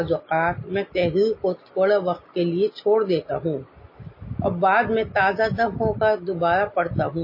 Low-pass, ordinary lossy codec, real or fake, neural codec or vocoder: 5.4 kHz; MP3, 24 kbps; real; none